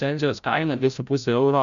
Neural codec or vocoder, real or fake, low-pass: codec, 16 kHz, 0.5 kbps, FreqCodec, larger model; fake; 7.2 kHz